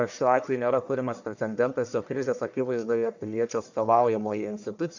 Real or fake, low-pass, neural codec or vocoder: fake; 7.2 kHz; codec, 44.1 kHz, 1.7 kbps, Pupu-Codec